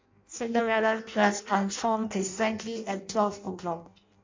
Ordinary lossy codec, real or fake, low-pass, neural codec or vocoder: MP3, 64 kbps; fake; 7.2 kHz; codec, 16 kHz in and 24 kHz out, 0.6 kbps, FireRedTTS-2 codec